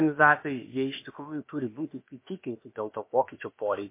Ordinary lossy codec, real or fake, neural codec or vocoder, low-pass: MP3, 24 kbps; fake; codec, 16 kHz, about 1 kbps, DyCAST, with the encoder's durations; 3.6 kHz